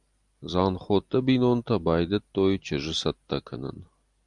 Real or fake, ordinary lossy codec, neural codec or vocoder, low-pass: real; Opus, 32 kbps; none; 10.8 kHz